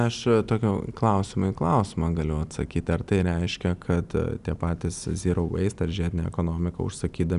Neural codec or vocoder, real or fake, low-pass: none; real; 10.8 kHz